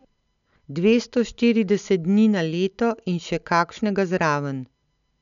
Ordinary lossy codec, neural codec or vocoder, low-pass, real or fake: none; none; 7.2 kHz; real